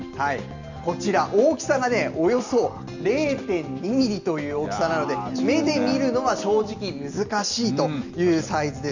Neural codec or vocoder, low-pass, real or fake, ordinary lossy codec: none; 7.2 kHz; real; none